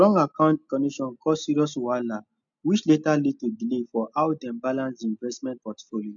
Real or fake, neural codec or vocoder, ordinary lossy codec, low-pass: real; none; none; 7.2 kHz